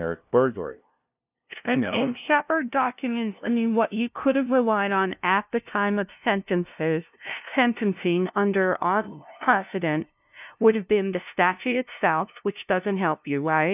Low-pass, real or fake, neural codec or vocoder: 3.6 kHz; fake; codec, 16 kHz, 0.5 kbps, FunCodec, trained on LibriTTS, 25 frames a second